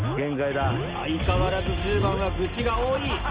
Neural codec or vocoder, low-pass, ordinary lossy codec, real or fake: none; 3.6 kHz; Opus, 24 kbps; real